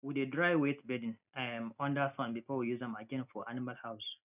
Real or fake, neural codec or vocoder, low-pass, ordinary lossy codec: real; none; 3.6 kHz; none